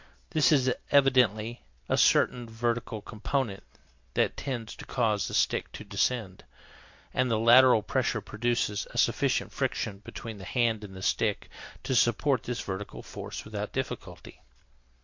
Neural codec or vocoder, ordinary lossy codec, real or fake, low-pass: none; MP3, 48 kbps; real; 7.2 kHz